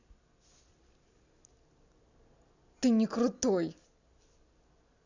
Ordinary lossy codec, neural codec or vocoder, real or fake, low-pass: none; none; real; 7.2 kHz